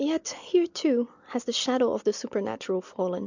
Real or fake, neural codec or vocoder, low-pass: real; none; 7.2 kHz